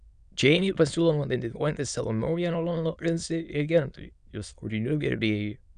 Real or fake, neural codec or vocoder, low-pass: fake; autoencoder, 22.05 kHz, a latent of 192 numbers a frame, VITS, trained on many speakers; 9.9 kHz